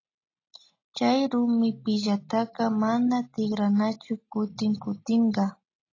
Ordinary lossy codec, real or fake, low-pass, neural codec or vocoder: AAC, 32 kbps; real; 7.2 kHz; none